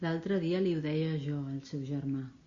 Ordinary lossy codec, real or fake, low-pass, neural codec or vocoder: Opus, 64 kbps; real; 7.2 kHz; none